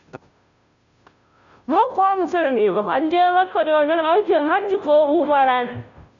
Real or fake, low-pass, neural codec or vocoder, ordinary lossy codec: fake; 7.2 kHz; codec, 16 kHz, 0.5 kbps, FunCodec, trained on Chinese and English, 25 frames a second; none